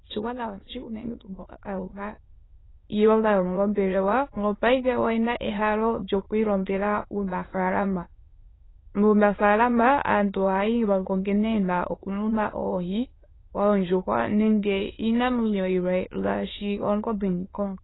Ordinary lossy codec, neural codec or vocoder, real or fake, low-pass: AAC, 16 kbps; autoencoder, 22.05 kHz, a latent of 192 numbers a frame, VITS, trained on many speakers; fake; 7.2 kHz